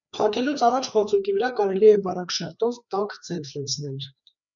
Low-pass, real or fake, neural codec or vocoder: 7.2 kHz; fake; codec, 16 kHz, 2 kbps, FreqCodec, larger model